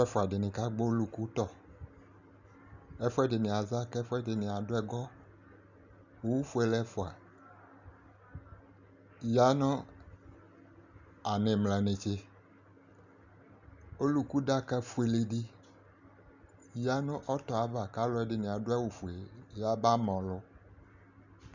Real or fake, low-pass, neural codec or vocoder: real; 7.2 kHz; none